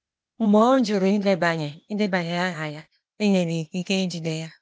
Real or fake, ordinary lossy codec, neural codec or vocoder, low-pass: fake; none; codec, 16 kHz, 0.8 kbps, ZipCodec; none